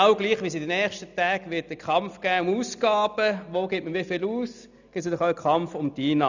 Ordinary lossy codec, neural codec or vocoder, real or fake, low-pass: none; none; real; 7.2 kHz